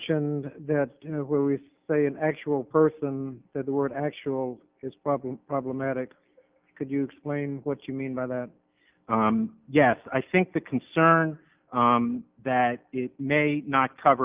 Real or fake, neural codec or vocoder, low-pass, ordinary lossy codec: real; none; 3.6 kHz; Opus, 32 kbps